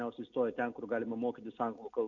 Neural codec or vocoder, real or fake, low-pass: none; real; 7.2 kHz